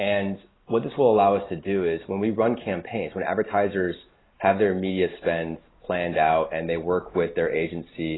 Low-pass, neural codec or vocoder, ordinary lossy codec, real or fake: 7.2 kHz; none; AAC, 16 kbps; real